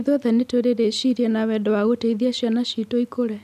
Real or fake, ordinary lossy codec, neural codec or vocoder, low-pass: real; none; none; 14.4 kHz